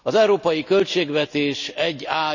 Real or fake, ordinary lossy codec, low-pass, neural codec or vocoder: real; none; 7.2 kHz; none